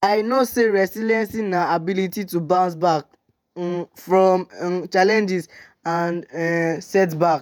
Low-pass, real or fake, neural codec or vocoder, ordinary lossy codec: none; fake; vocoder, 48 kHz, 128 mel bands, Vocos; none